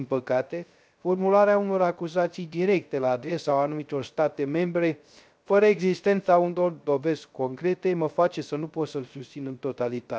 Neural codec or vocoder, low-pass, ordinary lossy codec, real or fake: codec, 16 kHz, 0.3 kbps, FocalCodec; none; none; fake